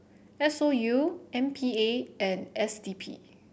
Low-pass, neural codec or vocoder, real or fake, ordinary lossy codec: none; none; real; none